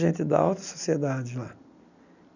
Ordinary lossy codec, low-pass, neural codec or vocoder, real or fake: none; 7.2 kHz; none; real